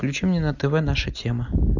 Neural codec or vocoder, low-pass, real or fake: none; 7.2 kHz; real